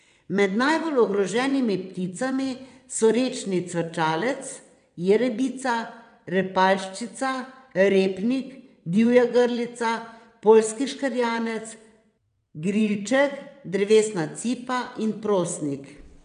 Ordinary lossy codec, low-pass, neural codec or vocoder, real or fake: none; 9.9 kHz; vocoder, 22.05 kHz, 80 mel bands, WaveNeXt; fake